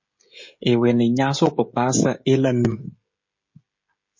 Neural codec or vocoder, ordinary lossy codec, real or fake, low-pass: codec, 16 kHz, 16 kbps, FreqCodec, smaller model; MP3, 32 kbps; fake; 7.2 kHz